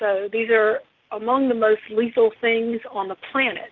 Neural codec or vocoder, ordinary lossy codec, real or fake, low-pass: none; Opus, 32 kbps; real; 7.2 kHz